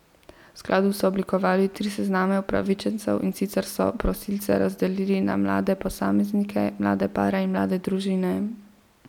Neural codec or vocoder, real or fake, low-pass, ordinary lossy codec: none; real; 19.8 kHz; none